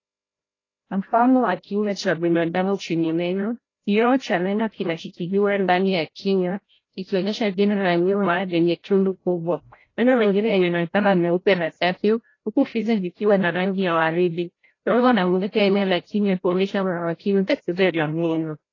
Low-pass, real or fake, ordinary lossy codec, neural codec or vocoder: 7.2 kHz; fake; AAC, 32 kbps; codec, 16 kHz, 0.5 kbps, FreqCodec, larger model